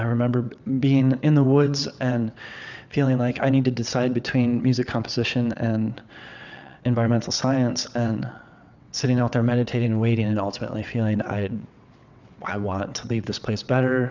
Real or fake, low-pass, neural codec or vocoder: fake; 7.2 kHz; vocoder, 22.05 kHz, 80 mel bands, WaveNeXt